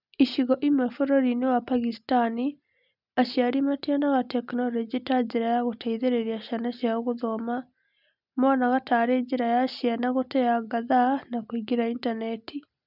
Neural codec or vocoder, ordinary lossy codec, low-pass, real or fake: none; none; 5.4 kHz; real